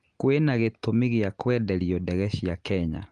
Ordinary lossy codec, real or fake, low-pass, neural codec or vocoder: Opus, 24 kbps; real; 9.9 kHz; none